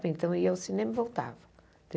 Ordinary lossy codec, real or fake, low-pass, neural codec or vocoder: none; real; none; none